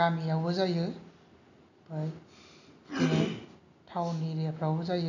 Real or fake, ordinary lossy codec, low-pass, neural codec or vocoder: real; none; 7.2 kHz; none